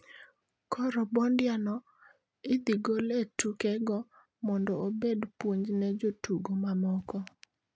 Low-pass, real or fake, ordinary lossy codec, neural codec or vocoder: none; real; none; none